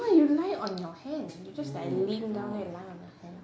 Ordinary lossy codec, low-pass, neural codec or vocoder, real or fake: none; none; none; real